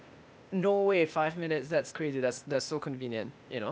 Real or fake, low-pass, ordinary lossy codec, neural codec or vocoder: fake; none; none; codec, 16 kHz, 0.8 kbps, ZipCodec